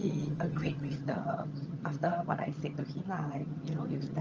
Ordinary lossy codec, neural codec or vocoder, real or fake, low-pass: Opus, 24 kbps; vocoder, 22.05 kHz, 80 mel bands, HiFi-GAN; fake; 7.2 kHz